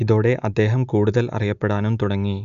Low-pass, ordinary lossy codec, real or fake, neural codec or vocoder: 7.2 kHz; none; real; none